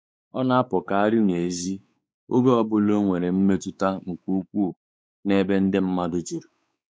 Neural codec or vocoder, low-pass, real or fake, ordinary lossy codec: codec, 16 kHz, 4 kbps, X-Codec, WavLM features, trained on Multilingual LibriSpeech; none; fake; none